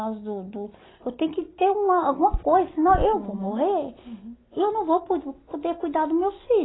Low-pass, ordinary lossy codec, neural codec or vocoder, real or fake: 7.2 kHz; AAC, 16 kbps; none; real